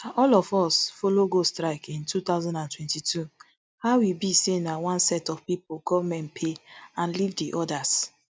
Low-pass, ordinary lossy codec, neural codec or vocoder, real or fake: none; none; none; real